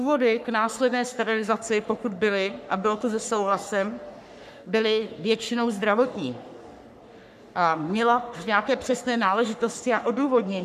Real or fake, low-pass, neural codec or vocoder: fake; 14.4 kHz; codec, 44.1 kHz, 3.4 kbps, Pupu-Codec